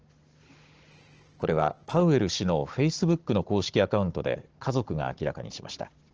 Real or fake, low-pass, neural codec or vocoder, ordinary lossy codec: fake; 7.2 kHz; codec, 16 kHz, 16 kbps, FunCodec, trained on Chinese and English, 50 frames a second; Opus, 16 kbps